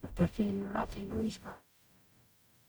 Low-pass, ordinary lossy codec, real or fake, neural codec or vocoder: none; none; fake; codec, 44.1 kHz, 0.9 kbps, DAC